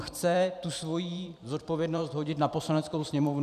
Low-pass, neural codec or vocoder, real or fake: 14.4 kHz; vocoder, 44.1 kHz, 128 mel bands every 512 samples, BigVGAN v2; fake